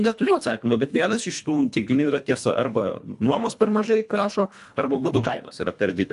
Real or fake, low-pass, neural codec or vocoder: fake; 10.8 kHz; codec, 24 kHz, 1.5 kbps, HILCodec